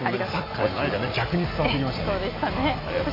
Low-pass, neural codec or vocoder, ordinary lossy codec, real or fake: 5.4 kHz; none; AAC, 24 kbps; real